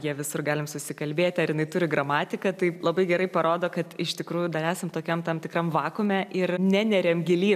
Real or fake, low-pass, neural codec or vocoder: real; 14.4 kHz; none